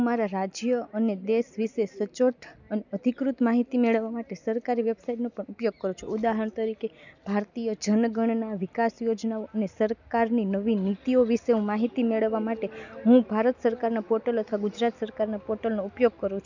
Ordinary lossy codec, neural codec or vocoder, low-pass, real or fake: none; none; 7.2 kHz; real